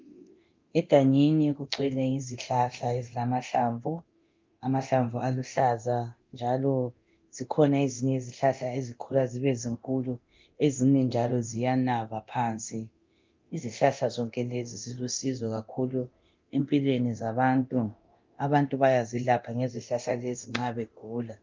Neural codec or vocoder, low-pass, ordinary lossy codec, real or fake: codec, 24 kHz, 0.9 kbps, DualCodec; 7.2 kHz; Opus, 24 kbps; fake